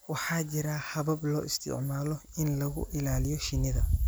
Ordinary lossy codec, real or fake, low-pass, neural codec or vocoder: none; real; none; none